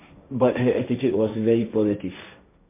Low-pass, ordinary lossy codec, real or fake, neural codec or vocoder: 3.6 kHz; MP3, 24 kbps; fake; codec, 16 kHz, 1.1 kbps, Voila-Tokenizer